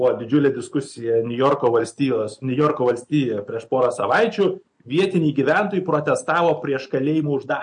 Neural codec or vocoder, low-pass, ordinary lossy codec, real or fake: none; 9.9 kHz; MP3, 48 kbps; real